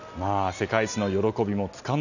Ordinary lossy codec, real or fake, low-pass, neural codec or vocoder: none; real; 7.2 kHz; none